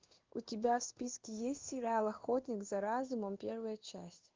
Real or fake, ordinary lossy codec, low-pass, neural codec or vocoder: fake; Opus, 32 kbps; 7.2 kHz; codec, 16 kHz in and 24 kHz out, 1 kbps, XY-Tokenizer